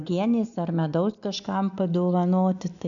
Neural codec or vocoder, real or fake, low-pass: none; real; 7.2 kHz